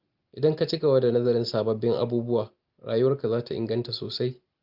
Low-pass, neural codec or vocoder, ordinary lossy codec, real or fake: 5.4 kHz; none; Opus, 32 kbps; real